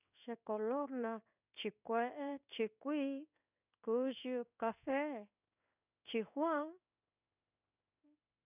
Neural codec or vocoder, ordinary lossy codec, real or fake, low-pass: codec, 16 kHz in and 24 kHz out, 1 kbps, XY-Tokenizer; none; fake; 3.6 kHz